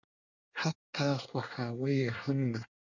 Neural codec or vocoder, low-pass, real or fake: codec, 24 kHz, 1 kbps, SNAC; 7.2 kHz; fake